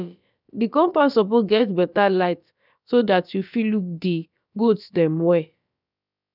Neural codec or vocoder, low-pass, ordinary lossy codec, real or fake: codec, 16 kHz, about 1 kbps, DyCAST, with the encoder's durations; 5.4 kHz; none; fake